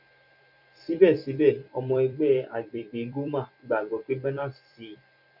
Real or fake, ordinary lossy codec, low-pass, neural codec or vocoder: fake; none; 5.4 kHz; vocoder, 44.1 kHz, 128 mel bands every 256 samples, BigVGAN v2